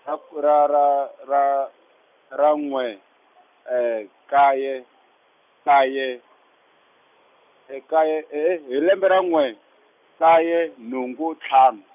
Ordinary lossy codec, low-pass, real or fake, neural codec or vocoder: none; 3.6 kHz; real; none